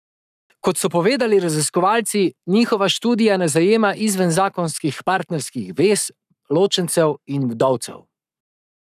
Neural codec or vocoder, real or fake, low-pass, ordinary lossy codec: codec, 44.1 kHz, 7.8 kbps, Pupu-Codec; fake; 14.4 kHz; none